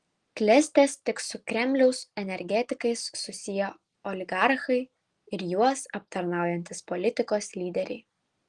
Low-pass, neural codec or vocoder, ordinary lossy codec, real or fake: 10.8 kHz; none; Opus, 24 kbps; real